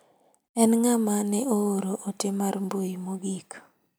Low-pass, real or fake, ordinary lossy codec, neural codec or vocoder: none; fake; none; vocoder, 44.1 kHz, 128 mel bands every 256 samples, BigVGAN v2